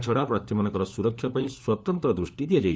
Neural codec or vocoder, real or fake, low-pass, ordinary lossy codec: codec, 16 kHz, 4 kbps, FunCodec, trained on LibriTTS, 50 frames a second; fake; none; none